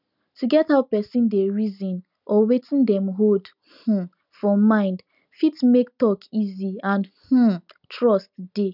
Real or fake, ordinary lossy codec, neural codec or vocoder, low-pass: real; none; none; 5.4 kHz